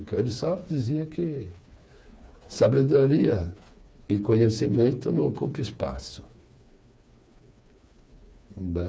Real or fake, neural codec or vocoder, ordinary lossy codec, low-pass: fake; codec, 16 kHz, 4 kbps, FreqCodec, smaller model; none; none